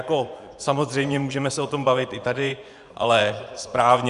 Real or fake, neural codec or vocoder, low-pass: fake; vocoder, 24 kHz, 100 mel bands, Vocos; 10.8 kHz